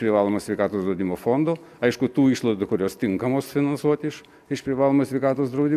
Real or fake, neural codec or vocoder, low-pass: real; none; 14.4 kHz